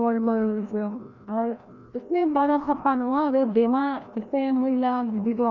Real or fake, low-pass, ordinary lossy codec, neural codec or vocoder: fake; 7.2 kHz; none; codec, 16 kHz, 1 kbps, FreqCodec, larger model